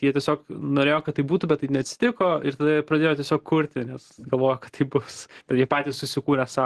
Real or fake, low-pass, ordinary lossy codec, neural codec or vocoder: real; 10.8 kHz; Opus, 16 kbps; none